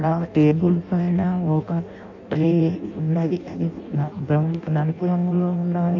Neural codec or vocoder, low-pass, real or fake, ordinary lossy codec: codec, 16 kHz in and 24 kHz out, 0.6 kbps, FireRedTTS-2 codec; 7.2 kHz; fake; MP3, 64 kbps